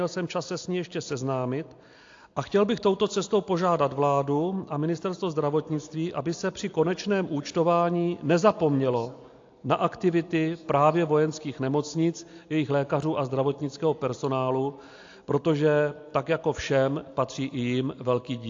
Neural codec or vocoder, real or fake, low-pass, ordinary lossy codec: none; real; 7.2 kHz; AAC, 48 kbps